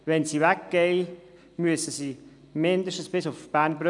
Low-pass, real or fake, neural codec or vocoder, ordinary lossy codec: 10.8 kHz; real; none; none